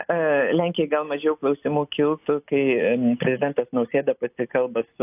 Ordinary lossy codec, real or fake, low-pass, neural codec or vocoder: AAC, 32 kbps; real; 3.6 kHz; none